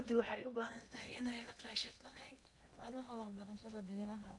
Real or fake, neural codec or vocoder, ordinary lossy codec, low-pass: fake; codec, 16 kHz in and 24 kHz out, 0.8 kbps, FocalCodec, streaming, 65536 codes; none; 10.8 kHz